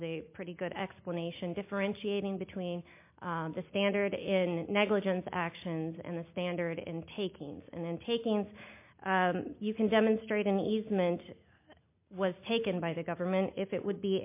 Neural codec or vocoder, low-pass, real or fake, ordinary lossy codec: none; 3.6 kHz; real; MP3, 24 kbps